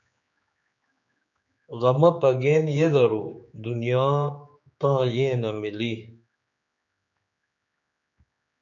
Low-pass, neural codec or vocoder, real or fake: 7.2 kHz; codec, 16 kHz, 4 kbps, X-Codec, HuBERT features, trained on general audio; fake